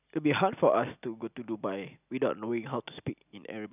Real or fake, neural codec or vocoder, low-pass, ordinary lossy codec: real; none; 3.6 kHz; none